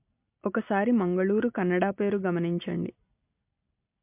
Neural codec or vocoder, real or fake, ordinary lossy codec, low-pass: none; real; MP3, 32 kbps; 3.6 kHz